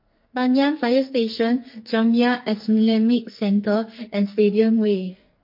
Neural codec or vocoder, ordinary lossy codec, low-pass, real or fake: codec, 44.1 kHz, 2.6 kbps, SNAC; MP3, 32 kbps; 5.4 kHz; fake